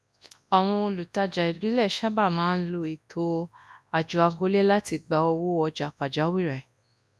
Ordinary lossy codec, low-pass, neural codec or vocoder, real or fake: none; none; codec, 24 kHz, 0.9 kbps, WavTokenizer, large speech release; fake